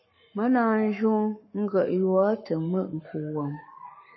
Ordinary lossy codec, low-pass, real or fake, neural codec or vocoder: MP3, 24 kbps; 7.2 kHz; fake; codec, 44.1 kHz, 7.8 kbps, Pupu-Codec